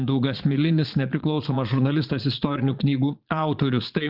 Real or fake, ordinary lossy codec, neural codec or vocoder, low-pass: fake; Opus, 32 kbps; vocoder, 22.05 kHz, 80 mel bands, Vocos; 5.4 kHz